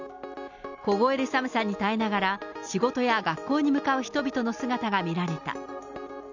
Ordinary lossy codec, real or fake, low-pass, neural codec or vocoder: none; real; 7.2 kHz; none